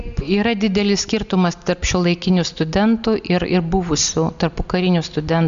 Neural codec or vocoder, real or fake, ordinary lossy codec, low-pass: none; real; MP3, 64 kbps; 7.2 kHz